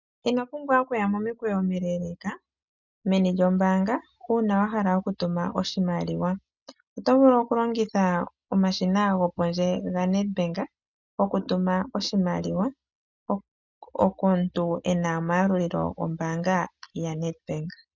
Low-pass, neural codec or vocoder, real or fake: 7.2 kHz; none; real